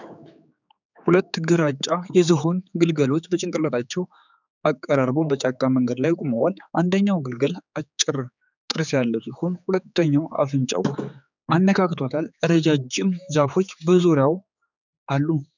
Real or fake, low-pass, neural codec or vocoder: fake; 7.2 kHz; codec, 16 kHz, 4 kbps, X-Codec, HuBERT features, trained on general audio